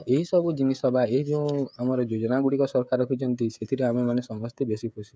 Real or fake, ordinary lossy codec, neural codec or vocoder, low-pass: fake; none; codec, 16 kHz, 16 kbps, FreqCodec, smaller model; none